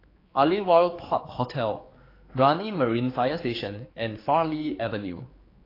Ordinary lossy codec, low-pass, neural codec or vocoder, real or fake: AAC, 24 kbps; 5.4 kHz; codec, 16 kHz, 4 kbps, X-Codec, HuBERT features, trained on general audio; fake